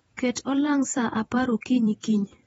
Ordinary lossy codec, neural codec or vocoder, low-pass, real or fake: AAC, 24 kbps; vocoder, 48 kHz, 128 mel bands, Vocos; 19.8 kHz; fake